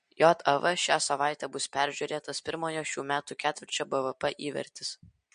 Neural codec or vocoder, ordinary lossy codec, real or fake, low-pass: none; MP3, 48 kbps; real; 9.9 kHz